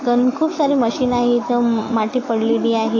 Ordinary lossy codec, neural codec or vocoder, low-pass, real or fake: AAC, 32 kbps; autoencoder, 48 kHz, 128 numbers a frame, DAC-VAE, trained on Japanese speech; 7.2 kHz; fake